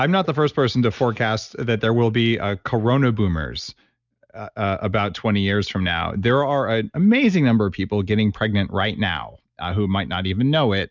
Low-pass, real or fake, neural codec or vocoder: 7.2 kHz; real; none